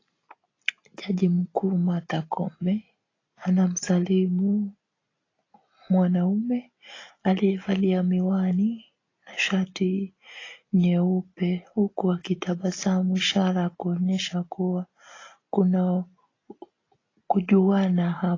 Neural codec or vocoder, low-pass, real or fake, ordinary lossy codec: none; 7.2 kHz; real; AAC, 32 kbps